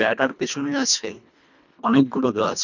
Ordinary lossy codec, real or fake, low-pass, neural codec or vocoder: none; fake; 7.2 kHz; codec, 24 kHz, 1.5 kbps, HILCodec